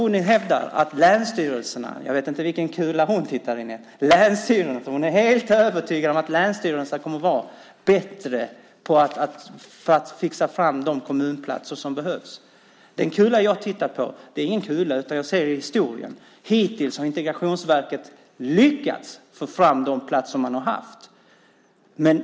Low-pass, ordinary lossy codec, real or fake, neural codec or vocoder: none; none; real; none